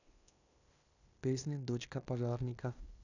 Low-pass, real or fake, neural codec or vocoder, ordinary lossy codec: 7.2 kHz; fake; codec, 16 kHz in and 24 kHz out, 0.9 kbps, LongCat-Audio-Codec, fine tuned four codebook decoder; none